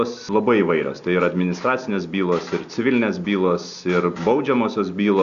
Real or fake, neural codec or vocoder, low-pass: real; none; 7.2 kHz